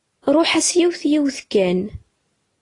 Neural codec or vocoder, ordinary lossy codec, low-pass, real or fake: none; AAC, 32 kbps; 10.8 kHz; real